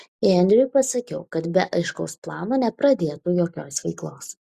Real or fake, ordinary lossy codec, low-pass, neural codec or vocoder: real; Opus, 64 kbps; 14.4 kHz; none